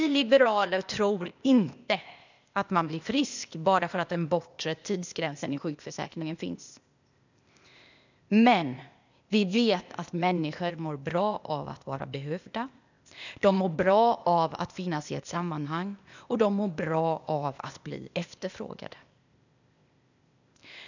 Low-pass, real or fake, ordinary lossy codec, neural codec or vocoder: 7.2 kHz; fake; none; codec, 16 kHz, 0.8 kbps, ZipCodec